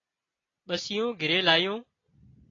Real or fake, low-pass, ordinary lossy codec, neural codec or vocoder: real; 7.2 kHz; AAC, 32 kbps; none